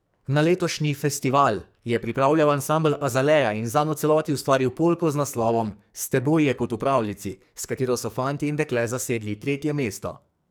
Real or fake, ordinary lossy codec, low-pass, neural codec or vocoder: fake; none; none; codec, 44.1 kHz, 2.6 kbps, SNAC